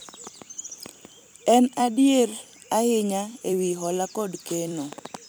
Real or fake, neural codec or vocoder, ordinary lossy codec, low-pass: fake; vocoder, 44.1 kHz, 128 mel bands every 256 samples, BigVGAN v2; none; none